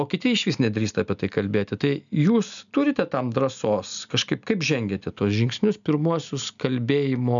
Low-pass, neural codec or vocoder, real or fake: 7.2 kHz; none; real